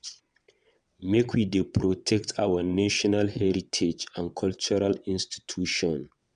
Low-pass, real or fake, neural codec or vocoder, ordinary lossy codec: 9.9 kHz; fake; vocoder, 22.05 kHz, 80 mel bands, WaveNeXt; MP3, 96 kbps